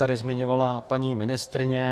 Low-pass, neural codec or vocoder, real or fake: 14.4 kHz; codec, 44.1 kHz, 2.6 kbps, DAC; fake